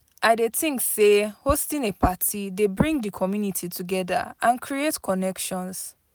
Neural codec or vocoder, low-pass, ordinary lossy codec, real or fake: none; none; none; real